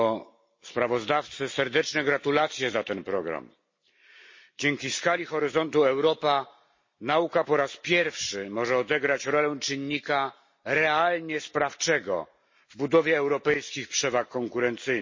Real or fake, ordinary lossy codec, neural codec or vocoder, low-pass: real; MP3, 32 kbps; none; 7.2 kHz